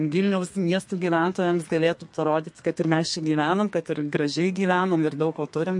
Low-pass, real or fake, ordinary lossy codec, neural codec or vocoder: 10.8 kHz; fake; MP3, 48 kbps; codec, 32 kHz, 1.9 kbps, SNAC